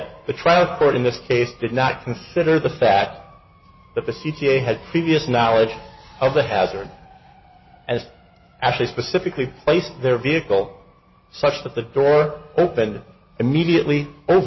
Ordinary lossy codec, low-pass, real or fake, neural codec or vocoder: MP3, 24 kbps; 7.2 kHz; real; none